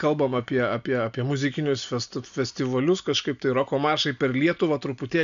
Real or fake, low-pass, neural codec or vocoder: real; 7.2 kHz; none